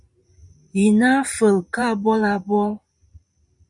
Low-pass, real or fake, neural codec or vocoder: 10.8 kHz; fake; vocoder, 44.1 kHz, 128 mel bands every 512 samples, BigVGAN v2